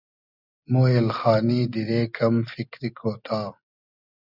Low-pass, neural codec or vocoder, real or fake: 5.4 kHz; none; real